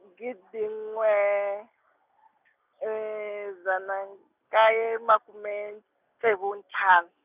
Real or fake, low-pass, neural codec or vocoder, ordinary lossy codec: real; 3.6 kHz; none; none